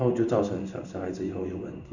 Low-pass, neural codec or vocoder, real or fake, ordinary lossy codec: 7.2 kHz; codec, 16 kHz in and 24 kHz out, 1 kbps, XY-Tokenizer; fake; none